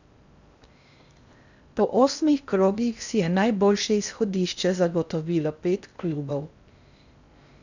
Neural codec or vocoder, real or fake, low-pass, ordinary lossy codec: codec, 16 kHz in and 24 kHz out, 0.6 kbps, FocalCodec, streaming, 4096 codes; fake; 7.2 kHz; none